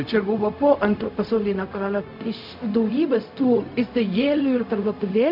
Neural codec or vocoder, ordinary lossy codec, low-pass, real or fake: codec, 16 kHz, 0.4 kbps, LongCat-Audio-Codec; MP3, 48 kbps; 5.4 kHz; fake